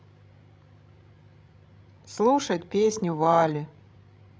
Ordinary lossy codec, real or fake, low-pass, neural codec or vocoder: none; fake; none; codec, 16 kHz, 16 kbps, FreqCodec, larger model